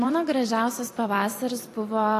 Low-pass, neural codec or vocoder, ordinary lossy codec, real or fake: 14.4 kHz; vocoder, 44.1 kHz, 128 mel bands every 256 samples, BigVGAN v2; AAC, 48 kbps; fake